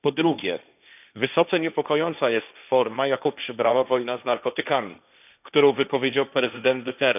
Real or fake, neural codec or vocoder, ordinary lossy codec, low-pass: fake; codec, 16 kHz, 1.1 kbps, Voila-Tokenizer; none; 3.6 kHz